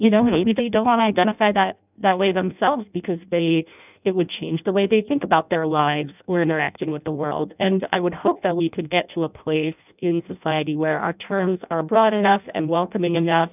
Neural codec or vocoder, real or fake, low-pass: codec, 16 kHz in and 24 kHz out, 0.6 kbps, FireRedTTS-2 codec; fake; 3.6 kHz